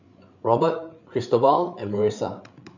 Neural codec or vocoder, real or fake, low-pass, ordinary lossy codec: codec, 16 kHz, 8 kbps, FreqCodec, larger model; fake; 7.2 kHz; none